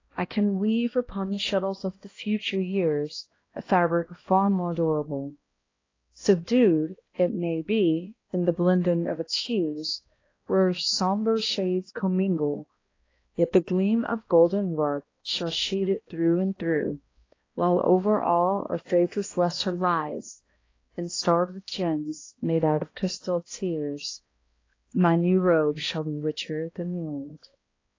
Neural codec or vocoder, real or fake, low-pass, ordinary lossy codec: codec, 16 kHz, 1 kbps, X-Codec, HuBERT features, trained on balanced general audio; fake; 7.2 kHz; AAC, 32 kbps